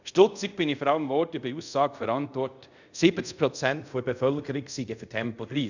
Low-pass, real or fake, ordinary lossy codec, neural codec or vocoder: 7.2 kHz; fake; none; codec, 24 kHz, 0.5 kbps, DualCodec